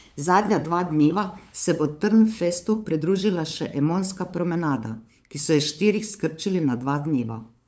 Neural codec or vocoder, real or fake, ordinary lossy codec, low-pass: codec, 16 kHz, 8 kbps, FunCodec, trained on LibriTTS, 25 frames a second; fake; none; none